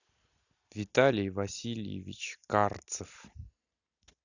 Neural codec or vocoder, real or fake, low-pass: none; real; 7.2 kHz